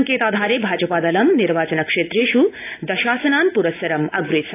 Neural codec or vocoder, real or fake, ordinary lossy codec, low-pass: none; real; AAC, 24 kbps; 3.6 kHz